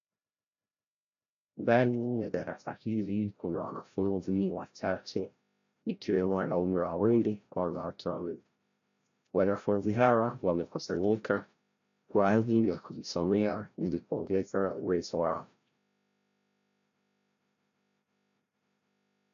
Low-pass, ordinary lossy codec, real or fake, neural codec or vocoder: 7.2 kHz; AAC, 64 kbps; fake; codec, 16 kHz, 0.5 kbps, FreqCodec, larger model